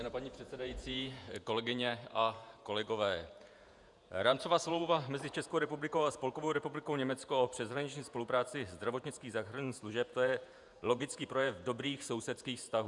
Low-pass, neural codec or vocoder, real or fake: 10.8 kHz; vocoder, 48 kHz, 128 mel bands, Vocos; fake